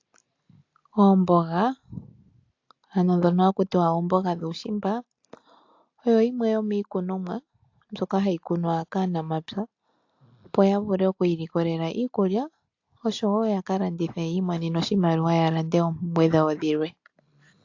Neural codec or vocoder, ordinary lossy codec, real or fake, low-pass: none; AAC, 48 kbps; real; 7.2 kHz